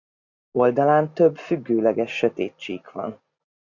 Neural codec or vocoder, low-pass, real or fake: none; 7.2 kHz; real